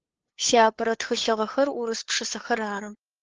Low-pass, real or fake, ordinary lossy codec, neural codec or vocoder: 7.2 kHz; fake; Opus, 16 kbps; codec, 16 kHz, 2 kbps, FunCodec, trained on LibriTTS, 25 frames a second